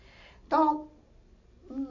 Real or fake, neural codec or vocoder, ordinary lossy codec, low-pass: real; none; none; 7.2 kHz